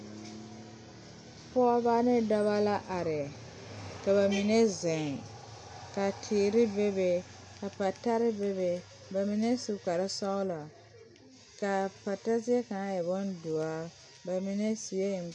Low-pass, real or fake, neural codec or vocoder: 9.9 kHz; real; none